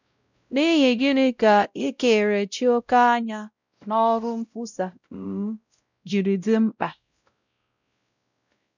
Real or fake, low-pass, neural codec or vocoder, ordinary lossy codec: fake; 7.2 kHz; codec, 16 kHz, 0.5 kbps, X-Codec, WavLM features, trained on Multilingual LibriSpeech; none